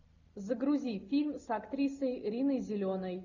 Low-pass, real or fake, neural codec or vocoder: 7.2 kHz; real; none